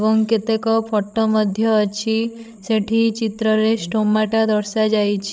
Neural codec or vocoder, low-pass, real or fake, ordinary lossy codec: codec, 16 kHz, 16 kbps, FreqCodec, larger model; none; fake; none